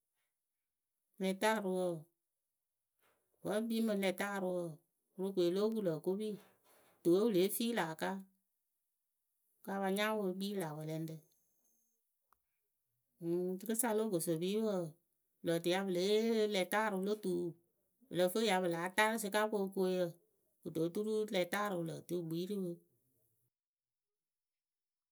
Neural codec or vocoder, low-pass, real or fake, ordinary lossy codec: none; none; real; none